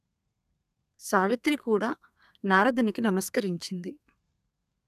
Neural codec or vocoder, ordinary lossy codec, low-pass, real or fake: codec, 32 kHz, 1.9 kbps, SNAC; none; 14.4 kHz; fake